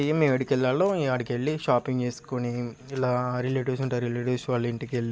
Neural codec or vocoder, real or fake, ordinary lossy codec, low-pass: none; real; none; none